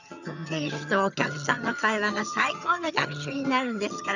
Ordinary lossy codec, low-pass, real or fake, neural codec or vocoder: none; 7.2 kHz; fake; vocoder, 22.05 kHz, 80 mel bands, HiFi-GAN